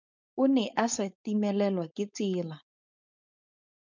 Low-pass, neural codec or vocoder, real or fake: 7.2 kHz; codec, 16 kHz, 4.8 kbps, FACodec; fake